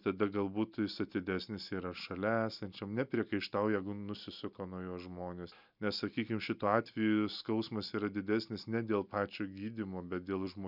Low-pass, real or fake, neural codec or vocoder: 5.4 kHz; real; none